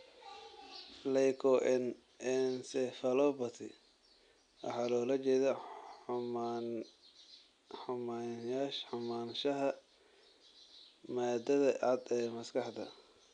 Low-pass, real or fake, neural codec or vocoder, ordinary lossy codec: 9.9 kHz; real; none; none